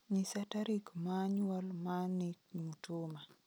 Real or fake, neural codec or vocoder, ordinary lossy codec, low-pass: real; none; none; none